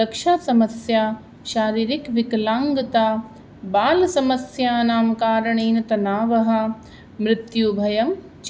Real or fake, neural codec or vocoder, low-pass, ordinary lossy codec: real; none; none; none